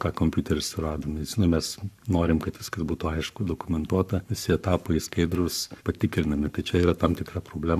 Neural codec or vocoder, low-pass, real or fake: codec, 44.1 kHz, 7.8 kbps, Pupu-Codec; 14.4 kHz; fake